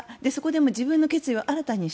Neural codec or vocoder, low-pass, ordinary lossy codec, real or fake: none; none; none; real